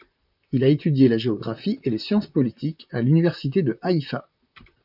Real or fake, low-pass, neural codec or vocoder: fake; 5.4 kHz; vocoder, 44.1 kHz, 128 mel bands, Pupu-Vocoder